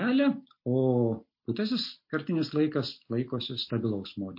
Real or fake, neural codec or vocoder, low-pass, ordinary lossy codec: real; none; 5.4 kHz; MP3, 32 kbps